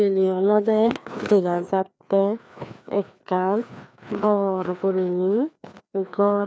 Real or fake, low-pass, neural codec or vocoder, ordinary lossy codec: fake; none; codec, 16 kHz, 2 kbps, FreqCodec, larger model; none